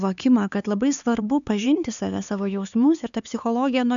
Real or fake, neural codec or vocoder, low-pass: fake; codec, 16 kHz, 4 kbps, X-Codec, HuBERT features, trained on LibriSpeech; 7.2 kHz